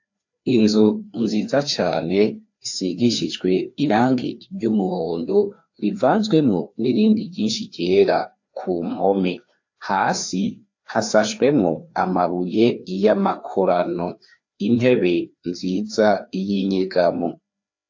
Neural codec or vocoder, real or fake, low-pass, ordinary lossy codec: codec, 16 kHz, 2 kbps, FreqCodec, larger model; fake; 7.2 kHz; AAC, 48 kbps